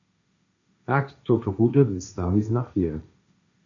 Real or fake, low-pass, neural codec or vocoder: fake; 7.2 kHz; codec, 16 kHz, 1.1 kbps, Voila-Tokenizer